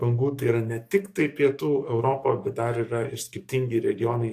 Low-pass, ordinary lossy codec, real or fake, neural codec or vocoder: 14.4 kHz; AAC, 64 kbps; fake; codec, 44.1 kHz, 7.8 kbps, DAC